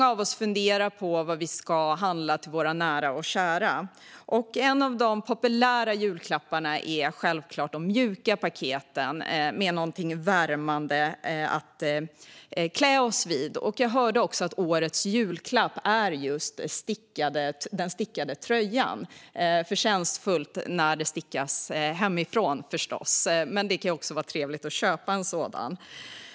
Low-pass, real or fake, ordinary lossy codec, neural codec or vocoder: none; real; none; none